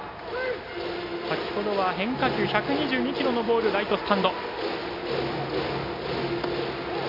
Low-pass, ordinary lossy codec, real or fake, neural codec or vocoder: 5.4 kHz; none; real; none